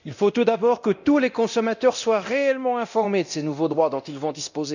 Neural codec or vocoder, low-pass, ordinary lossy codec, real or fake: codec, 24 kHz, 0.9 kbps, DualCodec; 7.2 kHz; none; fake